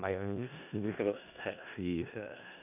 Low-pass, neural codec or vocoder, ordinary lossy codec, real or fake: 3.6 kHz; codec, 16 kHz in and 24 kHz out, 0.4 kbps, LongCat-Audio-Codec, four codebook decoder; none; fake